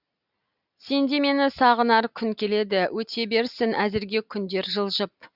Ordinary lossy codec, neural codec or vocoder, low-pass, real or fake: Opus, 64 kbps; none; 5.4 kHz; real